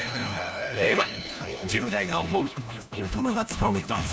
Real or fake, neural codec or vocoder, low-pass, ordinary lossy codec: fake; codec, 16 kHz, 1 kbps, FunCodec, trained on LibriTTS, 50 frames a second; none; none